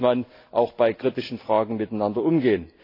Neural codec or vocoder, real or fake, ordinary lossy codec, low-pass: none; real; AAC, 32 kbps; 5.4 kHz